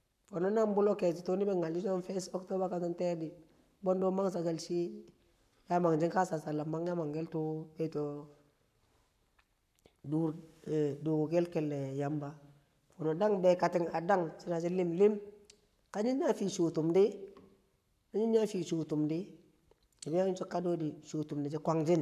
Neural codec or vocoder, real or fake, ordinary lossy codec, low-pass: codec, 44.1 kHz, 7.8 kbps, Pupu-Codec; fake; none; 14.4 kHz